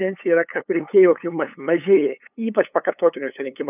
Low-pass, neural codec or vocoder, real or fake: 3.6 kHz; codec, 16 kHz, 8 kbps, FunCodec, trained on LibriTTS, 25 frames a second; fake